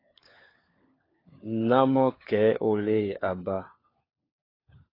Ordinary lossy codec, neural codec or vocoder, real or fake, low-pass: AAC, 24 kbps; codec, 16 kHz, 4 kbps, FunCodec, trained on LibriTTS, 50 frames a second; fake; 5.4 kHz